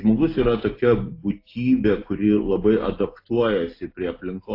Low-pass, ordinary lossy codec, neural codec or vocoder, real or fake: 5.4 kHz; AAC, 32 kbps; none; real